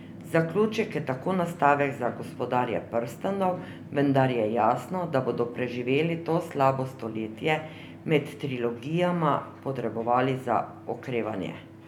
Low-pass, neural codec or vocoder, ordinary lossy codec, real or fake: 19.8 kHz; none; none; real